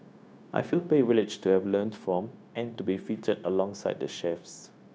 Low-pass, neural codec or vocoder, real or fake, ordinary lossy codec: none; codec, 16 kHz, 0.9 kbps, LongCat-Audio-Codec; fake; none